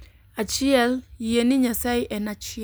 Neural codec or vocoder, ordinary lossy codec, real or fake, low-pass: none; none; real; none